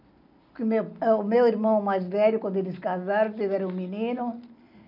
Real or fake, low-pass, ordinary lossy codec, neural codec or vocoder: real; 5.4 kHz; none; none